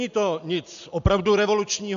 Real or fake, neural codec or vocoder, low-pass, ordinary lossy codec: real; none; 7.2 kHz; AAC, 96 kbps